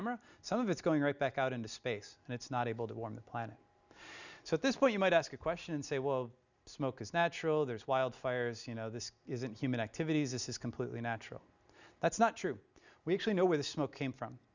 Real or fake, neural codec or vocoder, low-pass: real; none; 7.2 kHz